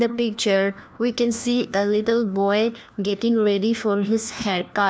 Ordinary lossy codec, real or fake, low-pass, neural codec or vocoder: none; fake; none; codec, 16 kHz, 1 kbps, FunCodec, trained on LibriTTS, 50 frames a second